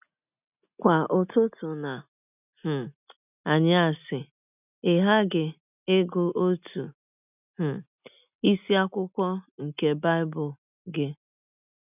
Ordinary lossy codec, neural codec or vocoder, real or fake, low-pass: none; none; real; 3.6 kHz